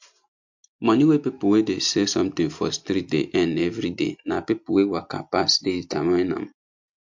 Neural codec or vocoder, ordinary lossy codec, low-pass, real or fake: none; MP3, 48 kbps; 7.2 kHz; real